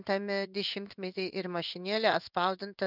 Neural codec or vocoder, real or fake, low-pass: codec, 16 kHz in and 24 kHz out, 1 kbps, XY-Tokenizer; fake; 5.4 kHz